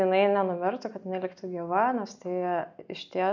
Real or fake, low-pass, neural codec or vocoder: real; 7.2 kHz; none